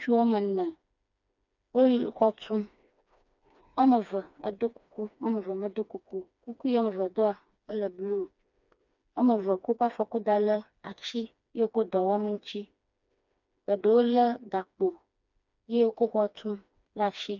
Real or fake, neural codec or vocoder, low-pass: fake; codec, 16 kHz, 2 kbps, FreqCodec, smaller model; 7.2 kHz